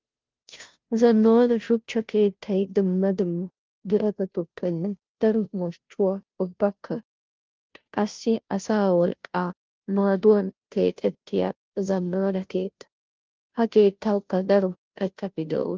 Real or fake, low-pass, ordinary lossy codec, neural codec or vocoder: fake; 7.2 kHz; Opus, 32 kbps; codec, 16 kHz, 0.5 kbps, FunCodec, trained on Chinese and English, 25 frames a second